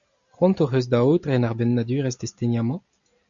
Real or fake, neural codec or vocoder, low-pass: real; none; 7.2 kHz